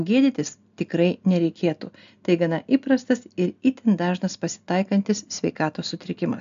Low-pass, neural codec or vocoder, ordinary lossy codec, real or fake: 7.2 kHz; none; AAC, 96 kbps; real